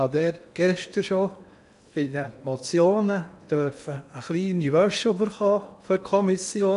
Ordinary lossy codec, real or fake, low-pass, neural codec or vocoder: none; fake; 10.8 kHz; codec, 16 kHz in and 24 kHz out, 0.8 kbps, FocalCodec, streaming, 65536 codes